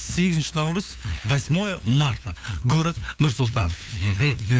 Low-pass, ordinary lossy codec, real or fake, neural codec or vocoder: none; none; fake; codec, 16 kHz, 2 kbps, FunCodec, trained on LibriTTS, 25 frames a second